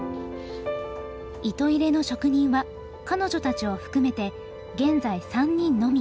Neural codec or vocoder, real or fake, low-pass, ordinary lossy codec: none; real; none; none